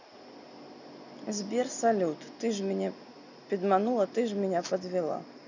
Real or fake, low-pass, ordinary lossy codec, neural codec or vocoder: real; 7.2 kHz; none; none